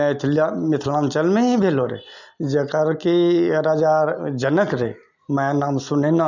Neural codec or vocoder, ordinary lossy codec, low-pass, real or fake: none; none; 7.2 kHz; real